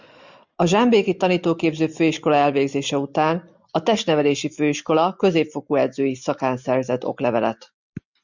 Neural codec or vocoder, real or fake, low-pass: none; real; 7.2 kHz